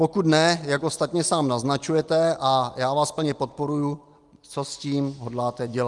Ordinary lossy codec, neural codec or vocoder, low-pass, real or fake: Opus, 32 kbps; none; 10.8 kHz; real